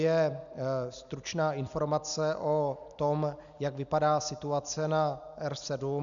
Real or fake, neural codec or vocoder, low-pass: real; none; 7.2 kHz